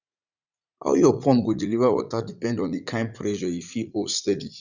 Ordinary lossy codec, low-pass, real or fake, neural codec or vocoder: none; 7.2 kHz; fake; vocoder, 44.1 kHz, 80 mel bands, Vocos